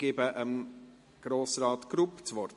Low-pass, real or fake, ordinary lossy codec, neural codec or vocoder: 14.4 kHz; real; MP3, 48 kbps; none